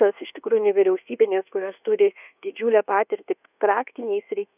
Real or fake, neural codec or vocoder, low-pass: fake; codec, 24 kHz, 1.2 kbps, DualCodec; 3.6 kHz